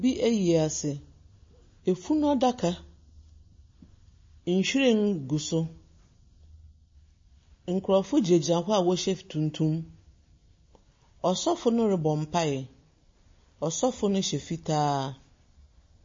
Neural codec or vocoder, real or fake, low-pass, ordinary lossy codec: none; real; 7.2 kHz; MP3, 32 kbps